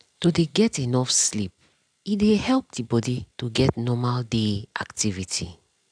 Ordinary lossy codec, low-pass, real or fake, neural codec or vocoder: none; 9.9 kHz; real; none